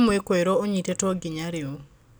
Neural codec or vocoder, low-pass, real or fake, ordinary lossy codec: none; none; real; none